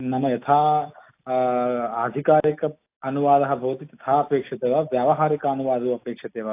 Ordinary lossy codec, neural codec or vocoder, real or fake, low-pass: AAC, 24 kbps; none; real; 3.6 kHz